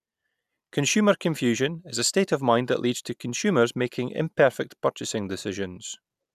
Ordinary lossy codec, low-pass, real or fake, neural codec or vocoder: none; 14.4 kHz; real; none